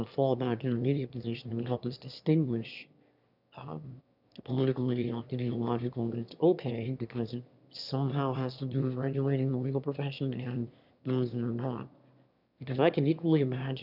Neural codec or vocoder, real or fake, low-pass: autoencoder, 22.05 kHz, a latent of 192 numbers a frame, VITS, trained on one speaker; fake; 5.4 kHz